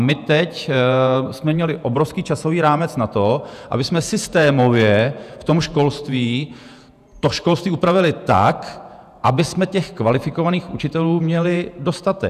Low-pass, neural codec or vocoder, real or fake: 14.4 kHz; vocoder, 48 kHz, 128 mel bands, Vocos; fake